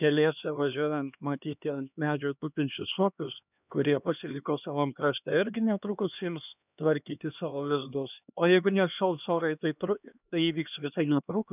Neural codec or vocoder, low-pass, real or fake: codec, 16 kHz, 2 kbps, X-Codec, HuBERT features, trained on LibriSpeech; 3.6 kHz; fake